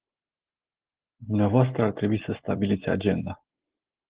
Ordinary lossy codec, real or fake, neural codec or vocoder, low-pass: Opus, 16 kbps; real; none; 3.6 kHz